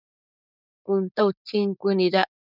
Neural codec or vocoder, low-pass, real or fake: codec, 16 kHz, 4.8 kbps, FACodec; 5.4 kHz; fake